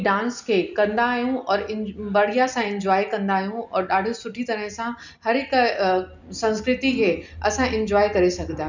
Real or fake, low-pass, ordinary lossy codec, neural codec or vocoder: real; 7.2 kHz; none; none